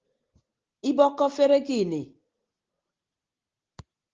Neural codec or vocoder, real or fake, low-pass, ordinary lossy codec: none; real; 7.2 kHz; Opus, 16 kbps